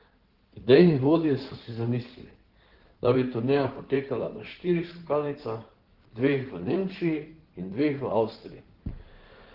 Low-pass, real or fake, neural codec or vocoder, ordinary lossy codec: 5.4 kHz; fake; codec, 16 kHz in and 24 kHz out, 2.2 kbps, FireRedTTS-2 codec; Opus, 16 kbps